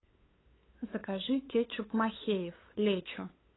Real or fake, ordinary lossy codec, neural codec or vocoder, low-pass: fake; AAC, 16 kbps; codec, 16 kHz, 8 kbps, FunCodec, trained on LibriTTS, 25 frames a second; 7.2 kHz